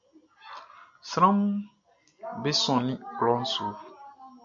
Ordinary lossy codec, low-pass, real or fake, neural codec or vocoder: MP3, 64 kbps; 7.2 kHz; real; none